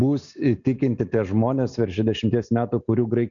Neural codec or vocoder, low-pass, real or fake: none; 7.2 kHz; real